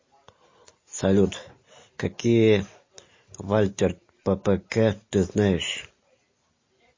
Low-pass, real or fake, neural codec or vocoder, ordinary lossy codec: 7.2 kHz; real; none; MP3, 32 kbps